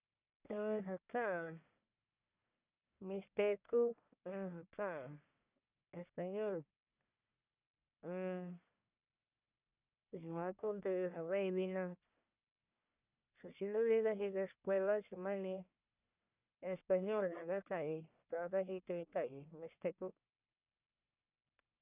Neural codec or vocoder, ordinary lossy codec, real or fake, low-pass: codec, 44.1 kHz, 1.7 kbps, Pupu-Codec; none; fake; 3.6 kHz